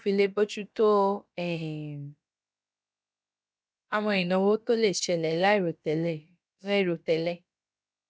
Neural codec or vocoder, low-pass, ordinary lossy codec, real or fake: codec, 16 kHz, about 1 kbps, DyCAST, with the encoder's durations; none; none; fake